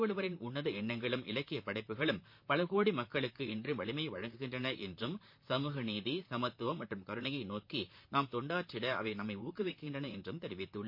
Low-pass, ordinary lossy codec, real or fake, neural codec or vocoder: 5.4 kHz; MP3, 32 kbps; fake; vocoder, 44.1 kHz, 128 mel bands, Pupu-Vocoder